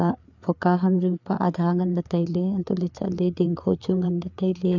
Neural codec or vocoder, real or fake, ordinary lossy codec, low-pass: codec, 16 kHz, 4 kbps, FreqCodec, larger model; fake; none; 7.2 kHz